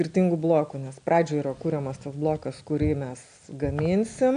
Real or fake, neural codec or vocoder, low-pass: real; none; 9.9 kHz